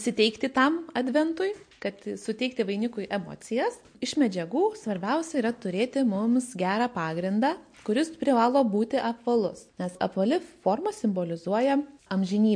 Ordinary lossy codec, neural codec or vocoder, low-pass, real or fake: MP3, 48 kbps; none; 9.9 kHz; real